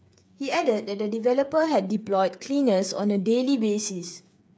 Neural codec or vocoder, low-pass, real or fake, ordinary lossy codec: codec, 16 kHz, 8 kbps, FreqCodec, smaller model; none; fake; none